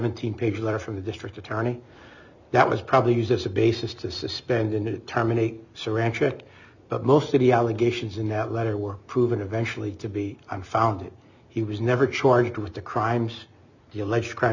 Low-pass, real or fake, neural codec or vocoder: 7.2 kHz; real; none